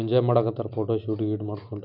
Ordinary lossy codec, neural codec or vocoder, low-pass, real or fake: none; none; 5.4 kHz; real